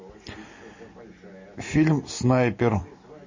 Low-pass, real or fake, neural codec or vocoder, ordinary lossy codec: 7.2 kHz; real; none; MP3, 32 kbps